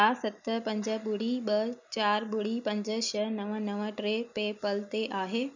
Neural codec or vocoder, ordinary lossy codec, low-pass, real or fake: none; none; 7.2 kHz; real